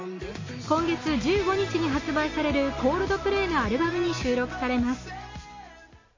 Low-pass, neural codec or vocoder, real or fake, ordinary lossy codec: 7.2 kHz; none; real; MP3, 32 kbps